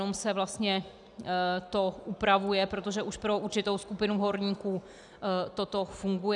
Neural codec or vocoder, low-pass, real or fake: none; 10.8 kHz; real